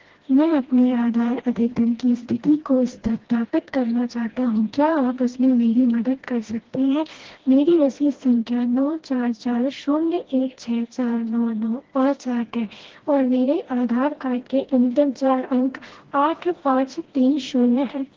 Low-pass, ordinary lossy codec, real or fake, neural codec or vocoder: 7.2 kHz; Opus, 16 kbps; fake; codec, 16 kHz, 1 kbps, FreqCodec, smaller model